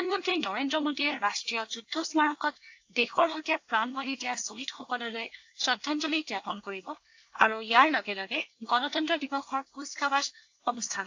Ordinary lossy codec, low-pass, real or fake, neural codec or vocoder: AAC, 48 kbps; 7.2 kHz; fake; codec, 24 kHz, 1 kbps, SNAC